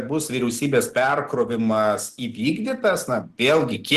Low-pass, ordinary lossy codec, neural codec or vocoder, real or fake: 14.4 kHz; Opus, 16 kbps; none; real